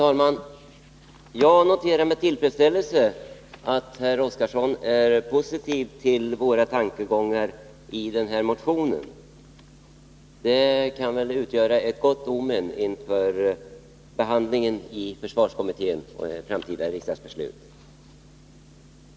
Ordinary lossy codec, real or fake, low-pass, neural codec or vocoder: none; real; none; none